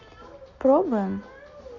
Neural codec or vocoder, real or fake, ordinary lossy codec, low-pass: none; real; none; 7.2 kHz